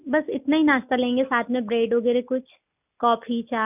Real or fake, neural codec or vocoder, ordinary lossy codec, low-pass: real; none; none; 3.6 kHz